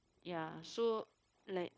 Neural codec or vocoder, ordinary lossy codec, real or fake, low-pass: codec, 16 kHz, 0.9 kbps, LongCat-Audio-Codec; none; fake; none